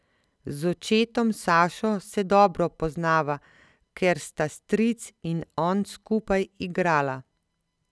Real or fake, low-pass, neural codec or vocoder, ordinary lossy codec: real; none; none; none